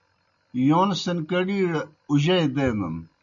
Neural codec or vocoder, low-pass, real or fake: none; 7.2 kHz; real